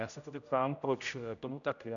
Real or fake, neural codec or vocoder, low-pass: fake; codec, 16 kHz, 0.5 kbps, X-Codec, HuBERT features, trained on general audio; 7.2 kHz